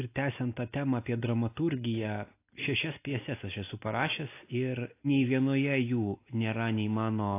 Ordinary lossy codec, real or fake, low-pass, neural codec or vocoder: AAC, 24 kbps; real; 3.6 kHz; none